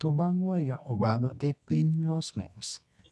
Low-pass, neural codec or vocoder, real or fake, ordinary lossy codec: none; codec, 24 kHz, 0.9 kbps, WavTokenizer, medium music audio release; fake; none